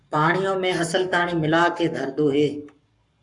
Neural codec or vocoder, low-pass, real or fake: codec, 44.1 kHz, 7.8 kbps, Pupu-Codec; 10.8 kHz; fake